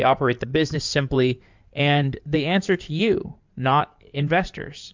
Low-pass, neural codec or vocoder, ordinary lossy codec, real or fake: 7.2 kHz; codec, 16 kHz in and 24 kHz out, 2.2 kbps, FireRedTTS-2 codec; MP3, 64 kbps; fake